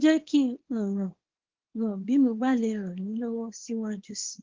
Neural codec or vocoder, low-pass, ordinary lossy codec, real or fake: codec, 24 kHz, 0.9 kbps, WavTokenizer, small release; 7.2 kHz; Opus, 16 kbps; fake